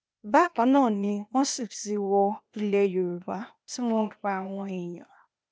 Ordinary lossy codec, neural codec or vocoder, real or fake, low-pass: none; codec, 16 kHz, 0.8 kbps, ZipCodec; fake; none